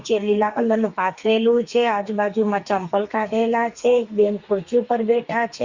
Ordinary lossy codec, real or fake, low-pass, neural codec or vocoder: Opus, 64 kbps; fake; 7.2 kHz; codec, 32 kHz, 1.9 kbps, SNAC